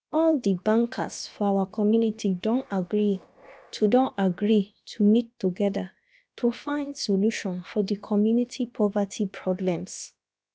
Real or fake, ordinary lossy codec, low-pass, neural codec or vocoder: fake; none; none; codec, 16 kHz, about 1 kbps, DyCAST, with the encoder's durations